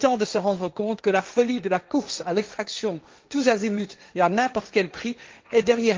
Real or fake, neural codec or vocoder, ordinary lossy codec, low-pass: fake; codec, 16 kHz, 1.1 kbps, Voila-Tokenizer; Opus, 32 kbps; 7.2 kHz